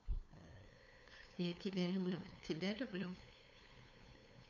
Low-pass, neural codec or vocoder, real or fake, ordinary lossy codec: 7.2 kHz; codec, 16 kHz, 2 kbps, FunCodec, trained on LibriTTS, 25 frames a second; fake; none